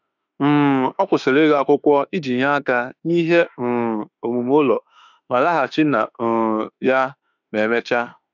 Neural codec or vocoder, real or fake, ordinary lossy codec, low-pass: autoencoder, 48 kHz, 32 numbers a frame, DAC-VAE, trained on Japanese speech; fake; none; 7.2 kHz